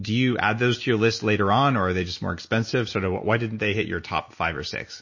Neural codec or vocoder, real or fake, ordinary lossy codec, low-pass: none; real; MP3, 32 kbps; 7.2 kHz